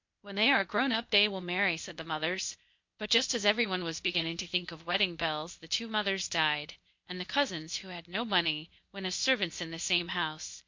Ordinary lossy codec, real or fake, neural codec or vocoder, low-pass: MP3, 48 kbps; fake; codec, 16 kHz, 0.8 kbps, ZipCodec; 7.2 kHz